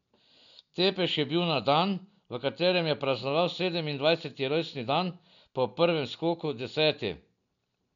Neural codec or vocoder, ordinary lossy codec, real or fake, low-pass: none; none; real; 7.2 kHz